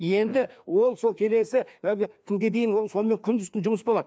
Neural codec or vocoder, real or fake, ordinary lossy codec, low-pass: codec, 16 kHz, 2 kbps, FreqCodec, larger model; fake; none; none